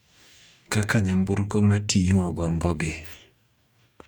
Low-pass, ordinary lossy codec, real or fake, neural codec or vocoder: 19.8 kHz; none; fake; codec, 44.1 kHz, 2.6 kbps, DAC